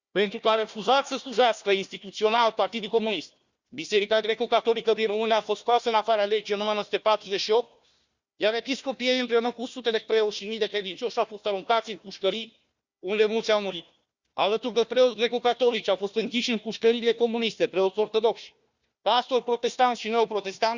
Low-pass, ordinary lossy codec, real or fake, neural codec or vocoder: 7.2 kHz; Opus, 64 kbps; fake; codec, 16 kHz, 1 kbps, FunCodec, trained on Chinese and English, 50 frames a second